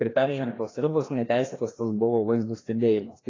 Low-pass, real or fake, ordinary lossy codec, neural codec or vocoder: 7.2 kHz; fake; AAC, 32 kbps; codec, 16 kHz, 1 kbps, FreqCodec, larger model